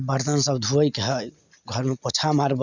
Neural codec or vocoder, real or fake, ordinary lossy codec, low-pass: none; real; none; 7.2 kHz